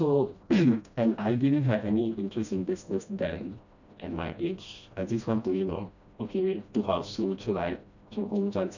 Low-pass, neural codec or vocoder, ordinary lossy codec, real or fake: 7.2 kHz; codec, 16 kHz, 1 kbps, FreqCodec, smaller model; none; fake